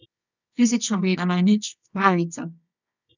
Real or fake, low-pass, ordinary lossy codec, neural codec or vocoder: fake; 7.2 kHz; none; codec, 24 kHz, 0.9 kbps, WavTokenizer, medium music audio release